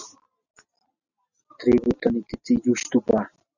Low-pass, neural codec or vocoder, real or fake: 7.2 kHz; none; real